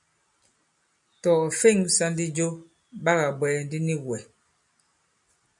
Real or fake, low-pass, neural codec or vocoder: real; 10.8 kHz; none